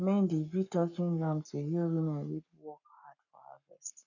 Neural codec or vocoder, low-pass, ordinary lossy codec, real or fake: codec, 44.1 kHz, 7.8 kbps, Pupu-Codec; 7.2 kHz; none; fake